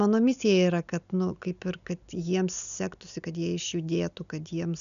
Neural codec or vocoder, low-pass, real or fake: none; 7.2 kHz; real